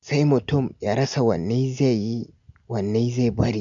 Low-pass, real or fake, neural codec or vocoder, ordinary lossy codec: 7.2 kHz; real; none; MP3, 64 kbps